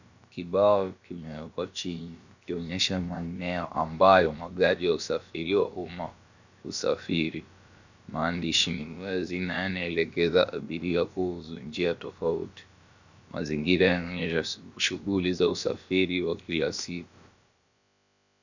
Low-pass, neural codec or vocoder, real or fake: 7.2 kHz; codec, 16 kHz, about 1 kbps, DyCAST, with the encoder's durations; fake